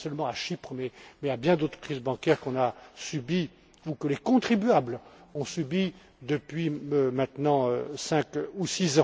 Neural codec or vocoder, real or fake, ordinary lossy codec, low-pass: none; real; none; none